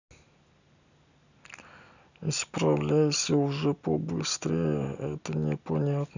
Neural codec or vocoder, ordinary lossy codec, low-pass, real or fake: none; none; 7.2 kHz; real